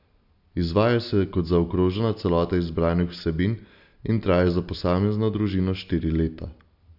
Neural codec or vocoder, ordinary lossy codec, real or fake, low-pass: none; AAC, 48 kbps; real; 5.4 kHz